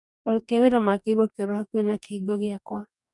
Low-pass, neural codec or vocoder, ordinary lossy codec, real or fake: 10.8 kHz; codec, 44.1 kHz, 2.6 kbps, DAC; none; fake